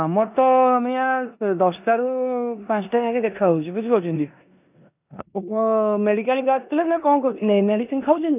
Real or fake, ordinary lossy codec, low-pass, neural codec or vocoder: fake; none; 3.6 kHz; codec, 16 kHz in and 24 kHz out, 0.9 kbps, LongCat-Audio-Codec, four codebook decoder